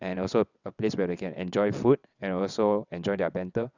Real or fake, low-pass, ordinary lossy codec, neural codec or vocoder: fake; 7.2 kHz; none; vocoder, 22.05 kHz, 80 mel bands, WaveNeXt